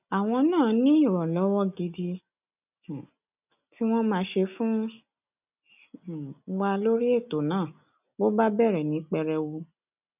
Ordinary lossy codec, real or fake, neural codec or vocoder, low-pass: none; real; none; 3.6 kHz